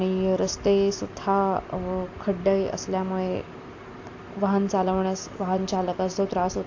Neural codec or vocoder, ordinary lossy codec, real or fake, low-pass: none; AAC, 48 kbps; real; 7.2 kHz